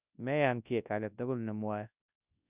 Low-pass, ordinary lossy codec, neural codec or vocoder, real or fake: 3.6 kHz; none; codec, 24 kHz, 0.9 kbps, WavTokenizer, large speech release; fake